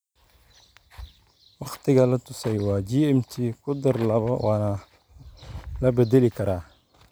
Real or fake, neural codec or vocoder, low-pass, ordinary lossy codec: real; none; none; none